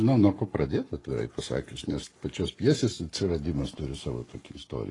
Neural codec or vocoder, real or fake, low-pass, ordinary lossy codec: codec, 44.1 kHz, 7.8 kbps, Pupu-Codec; fake; 10.8 kHz; AAC, 32 kbps